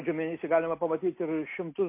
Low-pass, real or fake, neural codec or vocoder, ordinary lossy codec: 3.6 kHz; real; none; MP3, 24 kbps